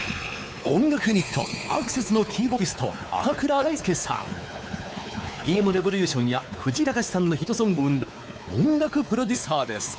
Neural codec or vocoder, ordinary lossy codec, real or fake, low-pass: codec, 16 kHz, 4 kbps, X-Codec, WavLM features, trained on Multilingual LibriSpeech; none; fake; none